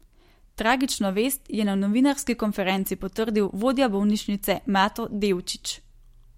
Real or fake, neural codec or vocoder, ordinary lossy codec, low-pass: real; none; MP3, 64 kbps; 19.8 kHz